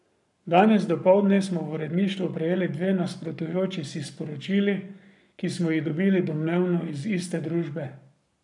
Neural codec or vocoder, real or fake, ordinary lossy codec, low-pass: codec, 44.1 kHz, 7.8 kbps, Pupu-Codec; fake; none; 10.8 kHz